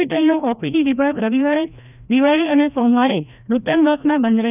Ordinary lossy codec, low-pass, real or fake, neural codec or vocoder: none; 3.6 kHz; fake; codec, 16 kHz, 1 kbps, FreqCodec, larger model